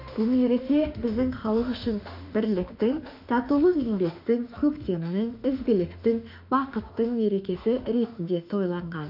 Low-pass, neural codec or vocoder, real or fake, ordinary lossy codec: 5.4 kHz; autoencoder, 48 kHz, 32 numbers a frame, DAC-VAE, trained on Japanese speech; fake; none